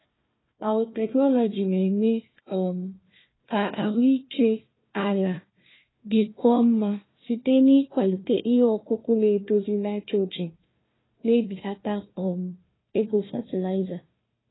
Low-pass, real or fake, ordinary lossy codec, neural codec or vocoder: 7.2 kHz; fake; AAC, 16 kbps; codec, 16 kHz, 1 kbps, FunCodec, trained on Chinese and English, 50 frames a second